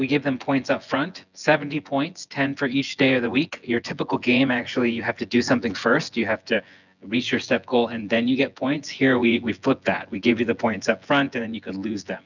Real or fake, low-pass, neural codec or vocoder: fake; 7.2 kHz; vocoder, 24 kHz, 100 mel bands, Vocos